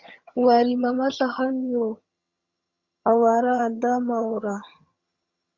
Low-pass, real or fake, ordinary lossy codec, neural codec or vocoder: 7.2 kHz; fake; Opus, 64 kbps; vocoder, 22.05 kHz, 80 mel bands, HiFi-GAN